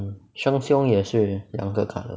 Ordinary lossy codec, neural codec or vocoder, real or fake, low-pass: none; none; real; none